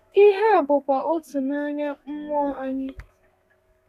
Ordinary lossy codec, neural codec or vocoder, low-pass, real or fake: none; codec, 32 kHz, 1.9 kbps, SNAC; 14.4 kHz; fake